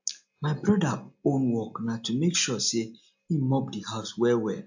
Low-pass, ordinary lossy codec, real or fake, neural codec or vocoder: 7.2 kHz; AAC, 48 kbps; real; none